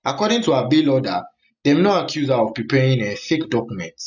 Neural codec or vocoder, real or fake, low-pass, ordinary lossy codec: none; real; 7.2 kHz; none